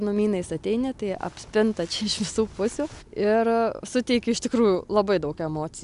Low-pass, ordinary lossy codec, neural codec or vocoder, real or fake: 10.8 kHz; AAC, 96 kbps; none; real